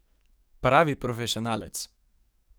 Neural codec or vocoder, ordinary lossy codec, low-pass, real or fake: codec, 44.1 kHz, 7.8 kbps, DAC; none; none; fake